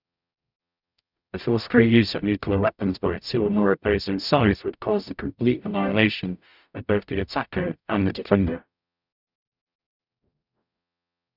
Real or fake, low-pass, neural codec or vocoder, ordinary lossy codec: fake; 5.4 kHz; codec, 44.1 kHz, 0.9 kbps, DAC; none